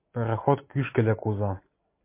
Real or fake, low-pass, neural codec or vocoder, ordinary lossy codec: real; 3.6 kHz; none; MP3, 24 kbps